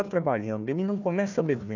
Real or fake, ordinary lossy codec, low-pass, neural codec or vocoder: fake; none; 7.2 kHz; codec, 16 kHz, 1 kbps, FunCodec, trained on Chinese and English, 50 frames a second